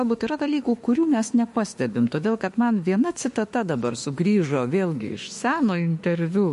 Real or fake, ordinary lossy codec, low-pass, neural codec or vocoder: fake; MP3, 48 kbps; 14.4 kHz; autoencoder, 48 kHz, 32 numbers a frame, DAC-VAE, trained on Japanese speech